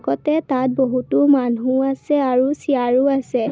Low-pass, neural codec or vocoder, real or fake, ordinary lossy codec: none; none; real; none